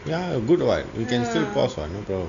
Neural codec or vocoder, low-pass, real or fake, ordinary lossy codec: none; 7.2 kHz; real; none